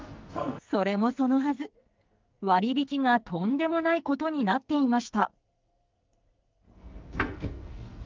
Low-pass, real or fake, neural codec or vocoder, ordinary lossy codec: 7.2 kHz; fake; codec, 44.1 kHz, 2.6 kbps, SNAC; Opus, 24 kbps